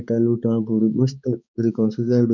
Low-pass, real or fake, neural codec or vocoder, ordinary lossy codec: 7.2 kHz; fake; codec, 16 kHz, 4 kbps, X-Codec, HuBERT features, trained on balanced general audio; none